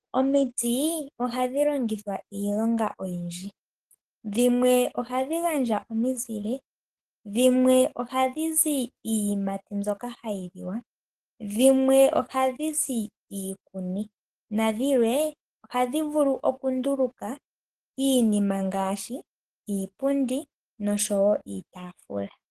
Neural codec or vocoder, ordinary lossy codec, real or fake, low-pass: codec, 44.1 kHz, 7.8 kbps, DAC; Opus, 16 kbps; fake; 14.4 kHz